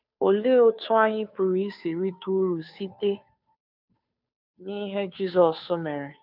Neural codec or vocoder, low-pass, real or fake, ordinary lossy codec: codec, 16 kHz, 2 kbps, FunCodec, trained on Chinese and English, 25 frames a second; 5.4 kHz; fake; none